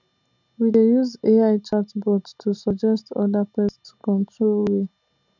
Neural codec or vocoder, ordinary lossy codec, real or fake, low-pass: none; none; real; 7.2 kHz